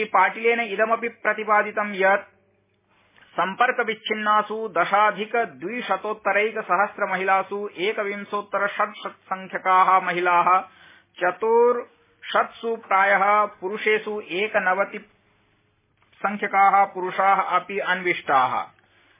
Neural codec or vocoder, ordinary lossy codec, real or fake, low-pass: none; MP3, 16 kbps; real; 3.6 kHz